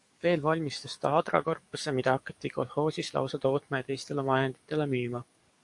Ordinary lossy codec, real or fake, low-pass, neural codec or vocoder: AAC, 64 kbps; fake; 10.8 kHz; codec, 44.1 kHz, 7.8 kbps, DAC